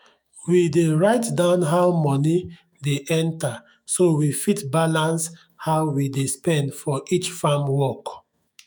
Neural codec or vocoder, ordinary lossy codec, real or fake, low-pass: autoencoder, 48 kHz, 128 numbers a frame, DAC-VAE, trained on Japanese speech; none; fake; none